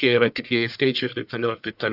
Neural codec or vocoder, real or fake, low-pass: codec, 44.1 kHz, 1.7 kbps, Pupu-Codec; fake; 5.4 kHz